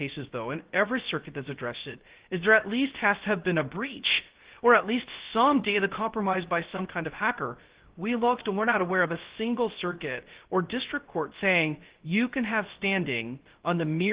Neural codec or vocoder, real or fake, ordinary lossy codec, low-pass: codec, 16 kHz, 0.3 kbps, FocalCodec; fake; Opus, 16 kbps; 3.6 kHz